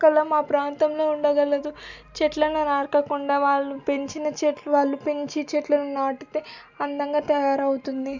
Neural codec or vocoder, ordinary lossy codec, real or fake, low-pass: none; none; real; 7.2 kHz